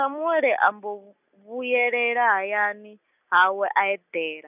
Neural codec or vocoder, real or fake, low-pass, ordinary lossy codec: none; real; 3.6 kHz; none